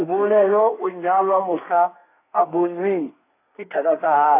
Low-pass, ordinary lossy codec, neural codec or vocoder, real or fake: 3.6 kHz; AAC, 24 kbps; codec, 32 kHz, 1.9 kbps, SNAC; fake